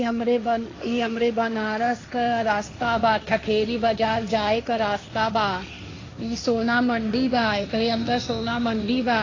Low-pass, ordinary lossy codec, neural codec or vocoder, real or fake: 7.2 kHz; AAC, 32 kbps; codec, 16 kHz, 1.1 kbps, Voila-Tokenizer; fake